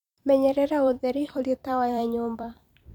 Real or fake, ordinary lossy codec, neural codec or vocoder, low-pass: fake; none; vocoder, 44.1 kHz, 128 mel bands every 256 samples, BigVGAN v2; 19.8 kHz